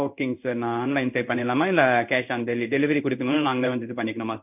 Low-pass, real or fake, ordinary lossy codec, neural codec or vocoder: 3.6 kHz; fake; none; codec, 16 kHz in and 24 kHz out, 1 kbps, XY-Tokenizer